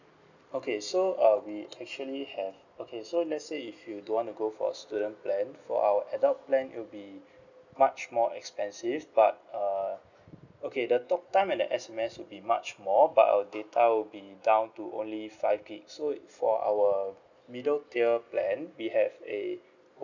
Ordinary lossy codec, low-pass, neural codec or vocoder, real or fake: none; 7.2 kHz; none; real